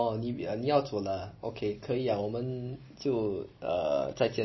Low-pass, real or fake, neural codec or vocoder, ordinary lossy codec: 7.2 kHz; real; none; MP3, 24 kbps